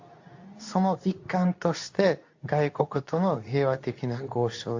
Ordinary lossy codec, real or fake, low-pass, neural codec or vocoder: AAC, 48 kbps; fake; 7.2 kHz; codec, 24 kHz, 0.9 kbps, WavTokenizer, medium speech release version 2